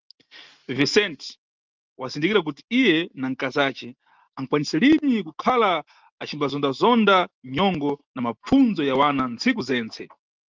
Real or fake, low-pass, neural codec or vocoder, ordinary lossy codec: real; 7.2 kHz; none; Opus, 32 kbps